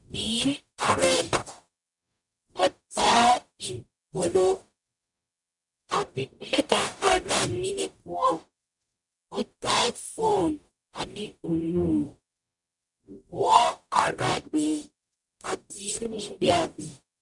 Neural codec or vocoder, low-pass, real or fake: codec, 44.1 kHz, 0.9 kbps, DAC; 10.8 kHz; fake